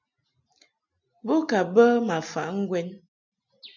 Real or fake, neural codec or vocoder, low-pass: real; none; 7.2 kHz